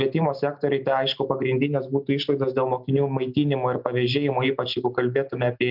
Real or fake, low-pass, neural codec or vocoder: real; 5.4 kHz; none